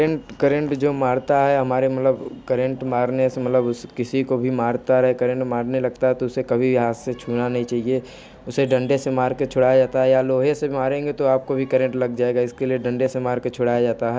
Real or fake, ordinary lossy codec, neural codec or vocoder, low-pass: real; none; none; none